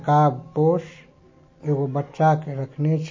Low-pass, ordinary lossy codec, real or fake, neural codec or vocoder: 7.2 kHz; MP3, 32 kbps; real; none